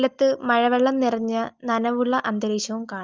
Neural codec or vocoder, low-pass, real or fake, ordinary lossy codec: none; 7.2 kHz; real; Opus, 32 kbps